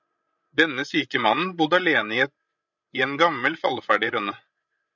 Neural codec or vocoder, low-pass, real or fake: codec, 16 kHz, 16 kbps, FreqCodec, larger model; 7.2 kHz; fake